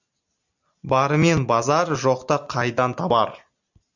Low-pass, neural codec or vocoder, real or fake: 7.2 kHz; none; real